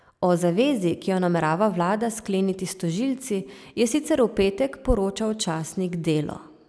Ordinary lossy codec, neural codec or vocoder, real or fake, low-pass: none; none; real; none